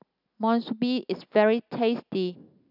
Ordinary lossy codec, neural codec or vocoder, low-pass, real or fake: none; none; 5.4 kHz; real